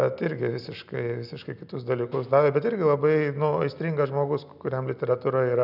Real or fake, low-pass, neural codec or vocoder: real; 5.4 kHz; none